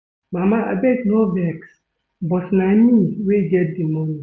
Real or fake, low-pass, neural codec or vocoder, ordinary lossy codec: real; none; none; none